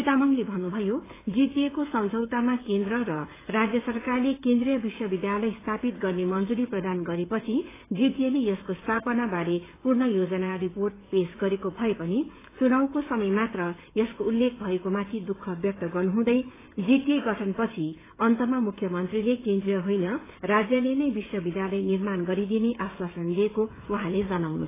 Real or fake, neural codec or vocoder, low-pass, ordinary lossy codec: fake; codec, 16 kHz, 16 kbps, FreqCodec, smaller model; 3.6 kHz; AAC, 16 kbps